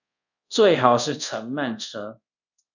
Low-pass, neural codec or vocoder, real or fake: 7.2 kHz; codec, 24 kHz, 0.5 kbps, DualCodec; fake